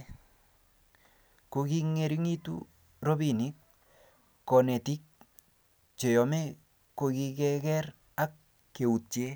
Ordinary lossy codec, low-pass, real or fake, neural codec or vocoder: none; none; real; none